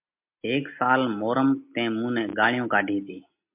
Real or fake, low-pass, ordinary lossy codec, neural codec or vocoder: real; 3.6 kHz; MP3, 32 kbps; none